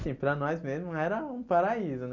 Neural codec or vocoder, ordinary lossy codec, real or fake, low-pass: none; none; real; 7.2 kHz